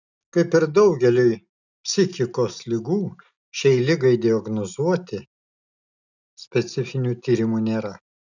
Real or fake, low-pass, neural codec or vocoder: real; 7.2 kHz; none